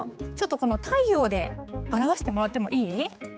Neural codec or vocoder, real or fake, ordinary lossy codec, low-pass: codec, 16 kHz, 4 kbps, X-Codec, HuBERT features, trained on general audio; fake; none; none